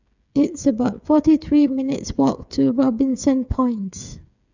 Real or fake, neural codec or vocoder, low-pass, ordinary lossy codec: fake; codec, 16 kHz, 8 kbps, FreqCodec, smaller model; 7.2 kHz; none